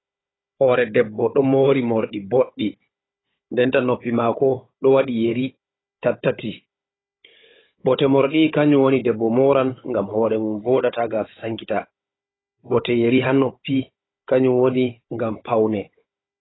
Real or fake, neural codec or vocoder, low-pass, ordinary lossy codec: fake; codec, 16 kHz, 16 kbps, FunCodec, trained on Chinese and English, 50 frames a second; 7.2 kHz; AAC, 16 kbps